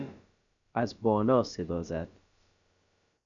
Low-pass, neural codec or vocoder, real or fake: 7.2 kHz; codec, 16 kHz, about 1 kbps, DyCAST, with the encoder's durations; fake